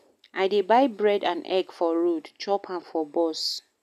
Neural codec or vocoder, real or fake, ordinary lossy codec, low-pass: none; real; none; 14.4 kHz